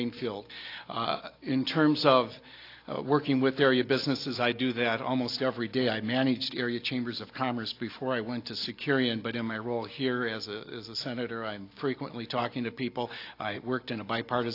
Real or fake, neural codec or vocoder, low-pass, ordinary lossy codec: real; none; 5.4 kHz; AAC, 32 kbps